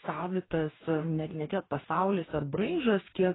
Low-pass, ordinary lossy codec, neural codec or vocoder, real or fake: 7.2 kHz; AAC, 16 kbps; codec, 44.1 kHz, 2.6 kbps, DAC; fake